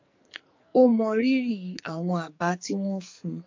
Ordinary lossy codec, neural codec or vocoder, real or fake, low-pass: MP3, 48 kbps; codec, 44.1 kHz, 2.6 kbps, SNAC; fake; 7.2 kHz